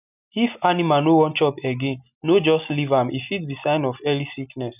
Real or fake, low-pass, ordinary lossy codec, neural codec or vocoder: real; 3.6 kHz; none; none